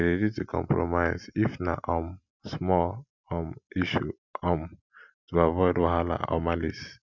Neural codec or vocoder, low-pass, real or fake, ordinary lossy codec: none; 7.2 kHz; real; none